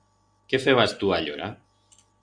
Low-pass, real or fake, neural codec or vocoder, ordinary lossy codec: 9.9 kHz; real; none; Opus, 64 kbps